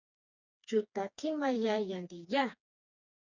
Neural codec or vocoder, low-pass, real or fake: codec, 16 kHz, 2 kbps, FreqCodec, smaller model; 7.2 kHz; fake